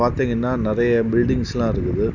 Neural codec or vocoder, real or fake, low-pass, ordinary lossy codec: none; real; 7.2 kHz; none